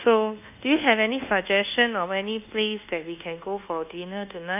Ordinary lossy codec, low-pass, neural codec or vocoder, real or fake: none; 3.6 kHz; codec, 24 kHz, 1.2 kbps, DualCodec; fake